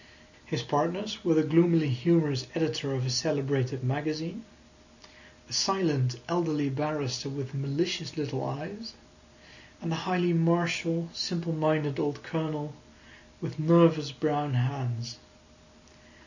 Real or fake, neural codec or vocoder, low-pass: real; none; 7.2 kHz